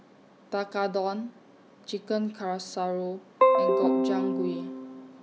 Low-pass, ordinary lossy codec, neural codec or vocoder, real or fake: none; none; none; real